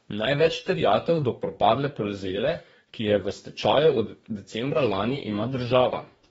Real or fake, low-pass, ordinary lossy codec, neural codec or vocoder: fake; 19.8 kHz; AAC, 24 kbps; codec, 44.1 kHz, 2.6 kbps, DAC